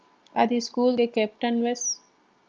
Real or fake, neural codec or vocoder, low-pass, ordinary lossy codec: real; none; 7.2 kHz; Opus, 24 kbps